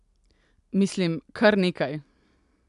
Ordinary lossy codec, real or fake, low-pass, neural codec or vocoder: none; real; 10.8 kHz; none